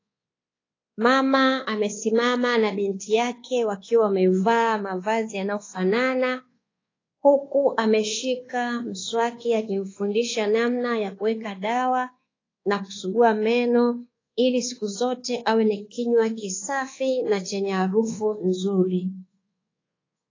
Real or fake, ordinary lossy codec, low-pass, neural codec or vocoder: fake; AAC, 32 kbps; 7.2 kHz; codec, 24 kHz, 1.2 kbps, DualCodec